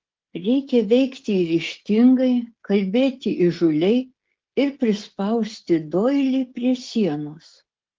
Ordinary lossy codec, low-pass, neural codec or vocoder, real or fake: Opus, 16 kbps; 7.2 kHz; codec, 16 kHz, 16 kbps, FreqCodec, smaller model; fake